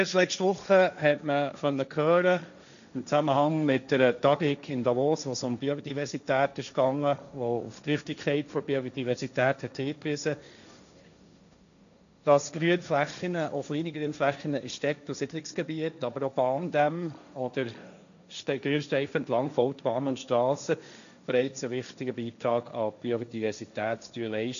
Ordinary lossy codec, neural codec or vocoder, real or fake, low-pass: none; codec, 16 kHz, 1.1 kbps, Voila-Tokenizer; fake; 7.2 kHz